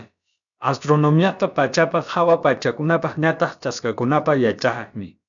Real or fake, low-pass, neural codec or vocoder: fake; 7.2 kHz; codec, 16 kHz, about 1 kbps, DyCAST, with the encoder's durations